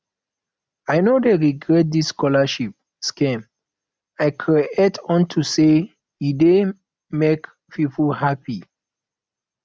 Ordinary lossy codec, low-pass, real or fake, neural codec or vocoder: none; none; real; none